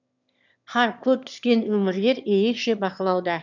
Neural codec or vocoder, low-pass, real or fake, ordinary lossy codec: autoencoder, 22.05 kHz, a latent of 192 numbers a frame, VITS, trained on one speaker; 7.2 kHz; fake; none